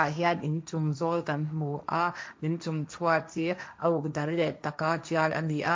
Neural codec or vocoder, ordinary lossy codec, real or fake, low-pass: codec, 16 kHz, 1.1 kbps, Voila-Tokenizer; none; fake; none